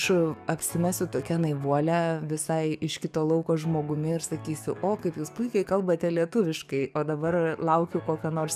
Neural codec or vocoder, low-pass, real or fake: codec, 44.1 kHz, 7.8 kbps, DAC; 14.4 kHz; fake